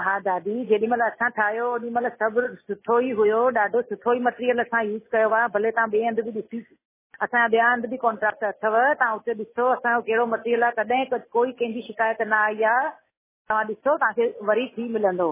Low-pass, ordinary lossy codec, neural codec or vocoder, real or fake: 3.6 kHz; MP3, 16 kbps; none; real